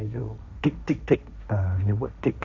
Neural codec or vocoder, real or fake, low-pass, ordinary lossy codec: codec, 16 kHz, 1.1 kbps, Voila-Tokenizer; fake; 7.2 kHz; none